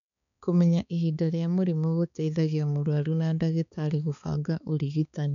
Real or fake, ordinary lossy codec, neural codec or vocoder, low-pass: fake; none; codec, 16 kHz, 4 kbps, X-Codec, HuBERT features, trained on balanced general audio; 7.2 kHz